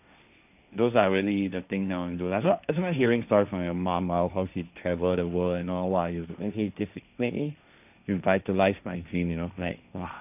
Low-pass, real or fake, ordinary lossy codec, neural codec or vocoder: 3.6 kHz; fake; none; codec, 16 kHz, 1.1 kbps, Voila-Tokenizer